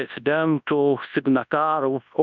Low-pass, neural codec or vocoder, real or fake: 7.2 kHz; codec, 24 kHz, 0.9 kbps, WavTokenizer, large speech release; fake